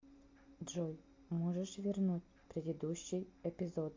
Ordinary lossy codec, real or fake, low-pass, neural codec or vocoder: MP3, 48 kbps; real; 7.2 kHz; none